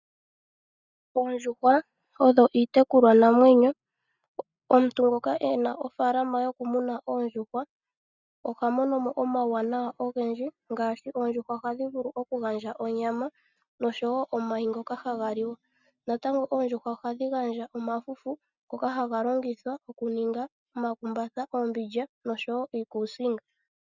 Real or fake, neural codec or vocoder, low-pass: real; none; 7.2 kHz